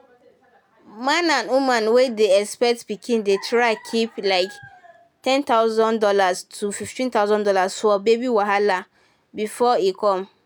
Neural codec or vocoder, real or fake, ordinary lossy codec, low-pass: none; real; none; none